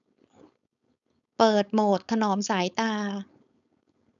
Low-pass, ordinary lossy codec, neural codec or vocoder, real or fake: 7.2 kHz; none; codec, 16 kHz, 4.8 kbps, FACodec; fake